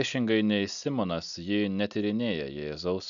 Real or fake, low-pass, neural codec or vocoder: real; 7.2 kHz; none